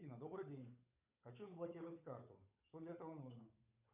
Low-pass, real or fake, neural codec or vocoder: 3.6 kHz; fake; codec, 16 kHz, 8 kbps, FunCodec, trained on Chinese and English, 25 frames a second